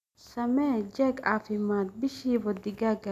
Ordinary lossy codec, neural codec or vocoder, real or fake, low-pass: none; none; real; 14.4 kHz